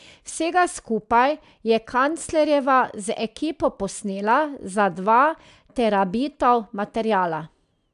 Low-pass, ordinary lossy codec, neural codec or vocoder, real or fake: 10.8 kHz; AAC, 96 kbps; none; real